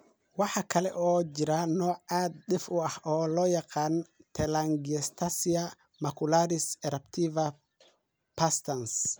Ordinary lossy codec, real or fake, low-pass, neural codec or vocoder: none; real; none; none